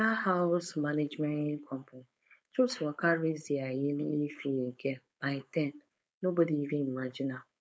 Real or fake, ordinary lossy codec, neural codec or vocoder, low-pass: fake; none; codec, 16 kHz, 4.8 kbps, FACodec; none